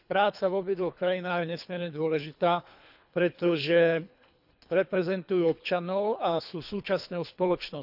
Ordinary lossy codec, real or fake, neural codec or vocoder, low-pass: none; fake; codec, 24 kHz, 3 kbps, HILCodec; 5.4 kHz